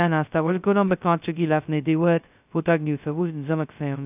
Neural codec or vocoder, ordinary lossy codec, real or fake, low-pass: codec, 16 kHz, 0.2 kbps, FocalCodec; none; fake; 3.6 kHz